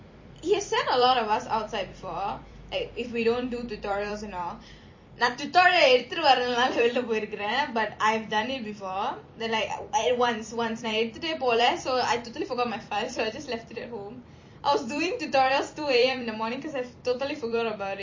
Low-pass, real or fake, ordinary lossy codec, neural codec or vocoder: 7.2 kHz; fake; MP3, 32 kbps; vocoder, 44.1 kHz, 128 mel bands every 256 samples, BigVGAN v2